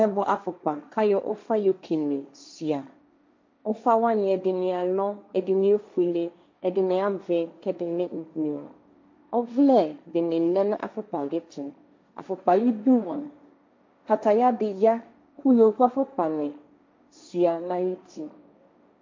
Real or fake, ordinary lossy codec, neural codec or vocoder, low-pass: fake; MP3, 48 kbps; codec, 16 kHz, 1.1 kbps, Voila-Tokenizer; 7.2 kHz